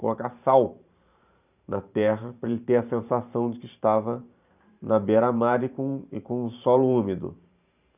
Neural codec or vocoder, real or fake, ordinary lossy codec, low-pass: vocoder, 44.1 kHz, 128 mel bands every 512 samples, BigVGAN v2; fake; none; 3.6 kHz